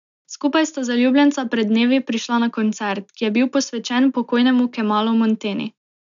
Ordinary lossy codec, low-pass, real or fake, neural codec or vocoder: MP3, 96 kbps; 7.2 kHz; real; none